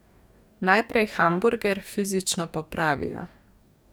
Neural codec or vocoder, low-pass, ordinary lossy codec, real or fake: codec, 44.1 kHz, 2.6 kbps, DAC; none; none; fake